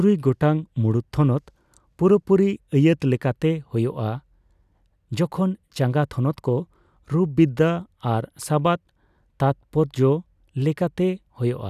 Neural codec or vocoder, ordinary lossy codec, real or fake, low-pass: none; none; real; 14.4 kHz